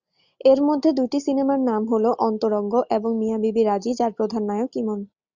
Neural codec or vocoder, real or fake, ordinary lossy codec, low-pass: none; real; Opus, 64 kbps; 7.2 kHz